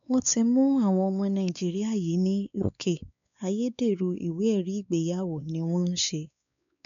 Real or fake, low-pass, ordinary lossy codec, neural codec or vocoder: fake; 7.2 kHz; none; codec, 16 kHz, 4 kbps, X-Codec, WavLM features, trained on Multilingual LibriSpeech